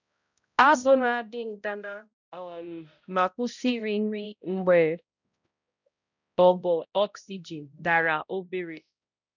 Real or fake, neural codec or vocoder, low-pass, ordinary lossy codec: fake; codec, 16 kHz, 0.5 kbps, X-Codec, HuBERT features, trained on balanced general audio; 7.2 kHz; none